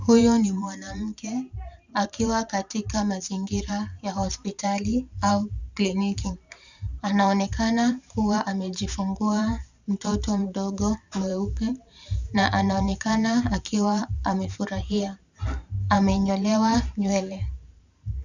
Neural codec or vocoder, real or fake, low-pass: vocoder, 44.1 kHz, 128 mel bands every 512 samples, BigVGAN v2; fake; 7.2 kHz